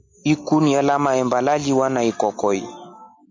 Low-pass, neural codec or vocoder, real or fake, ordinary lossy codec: 7.2 kHz; none; real; MP3, 64 kbps